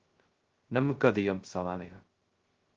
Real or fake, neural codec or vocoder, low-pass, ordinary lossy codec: fake; codec, 16 kHz, 0.2 kbps, FocalCodec; 7.2 kHz; Opus, 16 kbps